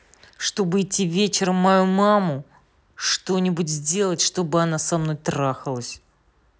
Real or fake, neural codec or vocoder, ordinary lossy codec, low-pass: real; none; none; none